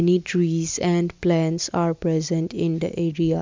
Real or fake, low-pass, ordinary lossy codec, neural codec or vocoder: real; 7.2 kHz; MP3, 64 kbps; none